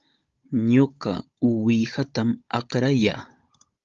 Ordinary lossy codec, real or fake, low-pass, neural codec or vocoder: Opus, 32 kbps; fake; 7.2 kHz; codec, 16 kHz, 16 kbps, FunCodec, trained on Chinese and English, 50 frames a second